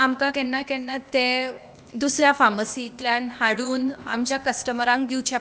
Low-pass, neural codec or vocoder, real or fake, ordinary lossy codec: none; codec, 16 kHz, 0.8 kbps, ZipCodec; fake; none